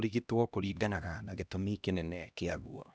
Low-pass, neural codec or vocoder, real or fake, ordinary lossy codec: none; codec, 16 kHz, 1 kbps, X-Codec, HuBERT features, trained on LibriSpeech; fake; none